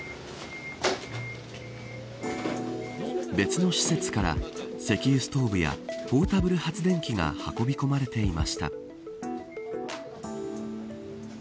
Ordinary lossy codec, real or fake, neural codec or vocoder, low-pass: none; real; none; none